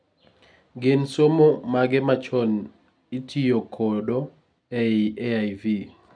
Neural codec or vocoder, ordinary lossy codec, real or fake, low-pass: none; none; real; 9.9 kHz